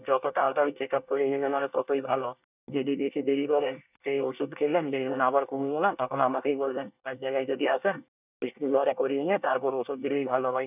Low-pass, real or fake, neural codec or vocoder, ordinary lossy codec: 3.6 kHz; fake; codec, 24 kHz, 1 kbps, SNAC; none